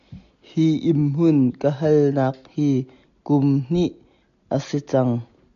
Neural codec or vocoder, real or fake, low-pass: none; real; 7.2 kHz